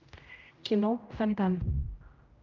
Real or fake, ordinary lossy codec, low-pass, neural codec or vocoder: fake; Opus, 24 kbps; 7.2 kHz; codec, 16 kHz, 0.5 kbps, X-Codec, HuBERT features, trained on general audio